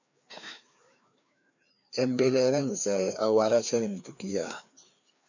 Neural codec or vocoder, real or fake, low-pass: codec, 16 kHz, 2 kbps, FreqCodec, larger model; fake; 7.2 kHz